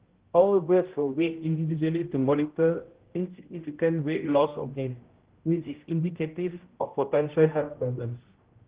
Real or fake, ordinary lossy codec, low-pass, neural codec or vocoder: fake; Opus, 16 kbps; 3.6 kHz; codec, 16 kHz, 0.5 kbps, X-Codec, HuBERT features, trained on general audio